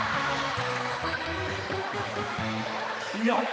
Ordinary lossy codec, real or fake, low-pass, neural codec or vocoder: none; fake; none; codec, 16 kHz, 4 kbps, X-Codec, HuBERT features, trained on balanced general audio